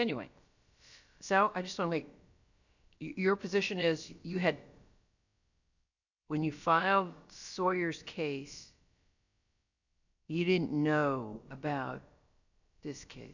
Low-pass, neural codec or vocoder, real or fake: 7.2 kHz; codec, 16 kHz, about 1 kbps, DyCAST, with the encoder's durations; fake